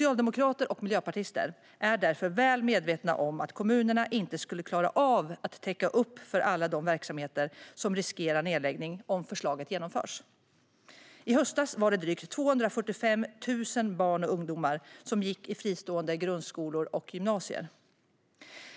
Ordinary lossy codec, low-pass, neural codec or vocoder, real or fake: none; none; none; real